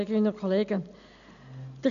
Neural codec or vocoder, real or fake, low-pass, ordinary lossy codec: none; real; 7.2 kHz; none